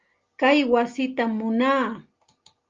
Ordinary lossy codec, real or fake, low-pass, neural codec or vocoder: Opus, 32 kbps; real; 7.2 kHz; none